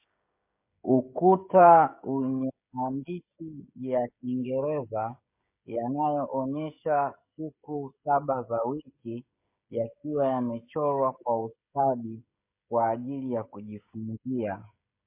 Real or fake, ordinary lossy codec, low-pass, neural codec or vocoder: fake; MP3, 24 kbps; 3.6 kHz; codec, 16 kHz, 16 kbps, FreqCodec, smaller model